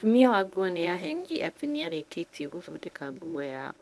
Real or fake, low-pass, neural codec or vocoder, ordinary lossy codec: fake; none; codec, 24 kHz, 0.9 kbps, WavTokenizer, medium speech release version 1; none